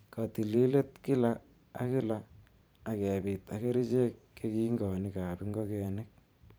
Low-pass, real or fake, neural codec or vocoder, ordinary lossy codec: none; fake; vocoder, 44.1 kHz, 128 mel bands every 512 samples, BigVGAN v2; none